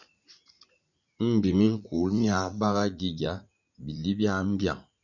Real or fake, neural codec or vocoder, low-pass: fake; vocoder, 44.1 kHz, 80 mel bands, Vocos; 7.2 kHz